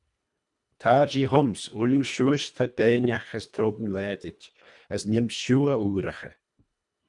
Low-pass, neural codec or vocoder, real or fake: 10.8 kHz; codec, 24 kHz, 1.5 kbps, HILCodec; fake